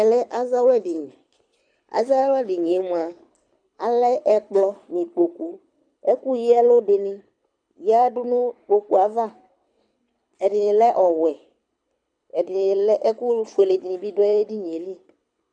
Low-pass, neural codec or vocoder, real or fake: 9.9 kHz; codec, 24 kHz, 6 kbps, HILCodec; fake